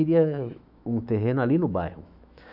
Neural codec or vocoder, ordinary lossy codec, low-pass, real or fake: autoencoder, 48 kHz, 128 numbers a frame, DAC-VAE, trained on Japanese speech; none; 5.4 kHz; fake